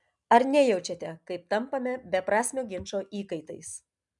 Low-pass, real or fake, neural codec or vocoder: 10.8 kHz; real; none